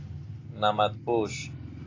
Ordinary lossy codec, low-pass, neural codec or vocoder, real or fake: AAC, 32 kbps; 7.2 kHz; none; real